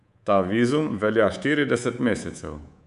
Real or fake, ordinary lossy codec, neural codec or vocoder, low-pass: fake; none; codec, 24 kHz, 3.1 kbps, DualCodec; 10.8 kHz